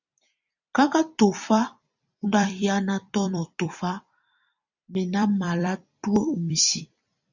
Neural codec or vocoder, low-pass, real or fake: vocoder, 44.1 kHz, 128 mel bands every 256 samples, BigVGAN v2; 7.2 kHz; fake